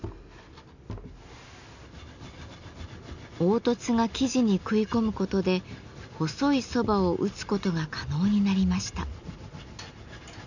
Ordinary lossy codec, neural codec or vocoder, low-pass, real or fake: none; none; 7.2 kHz; real